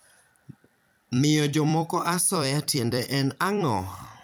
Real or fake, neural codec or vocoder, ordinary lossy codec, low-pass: fake; vocoder, 44.1 kHz, 128 mel bands every 256 samples, BigVGAN v2; none; none